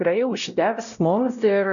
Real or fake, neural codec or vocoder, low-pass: fake; codec, 16 kHz, 0.5 kbps, X-Codec, WavLM features, trained on Multilingual LibriSpeech; 7.2 kHz